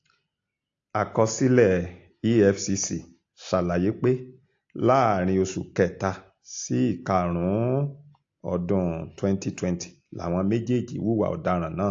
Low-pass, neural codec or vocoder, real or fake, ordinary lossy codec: 7.2 kHz; none; real; AAC, 48 kbps